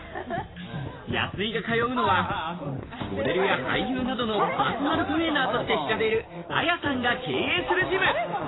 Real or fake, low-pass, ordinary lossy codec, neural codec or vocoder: fake; 7.2 kHz; AAC, 16 kbps; vocoder, 44.1 kHz, 128 mel bands every 512 samples, BigVGAN v2